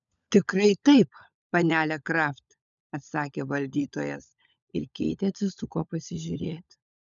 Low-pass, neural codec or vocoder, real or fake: 7.2 kHz; codec, 16 kHz, 16 kbps, FunCodec, trained on LibriTTS, 50 frames a second; fake